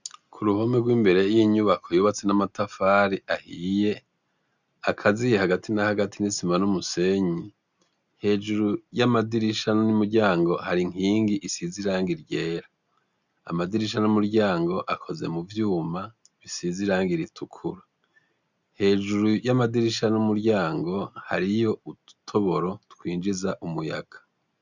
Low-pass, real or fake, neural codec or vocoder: 7.2 kHz; real; none